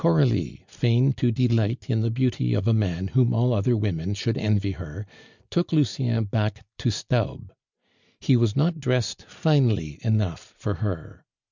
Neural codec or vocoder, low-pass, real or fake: none; 7.2 kHz; real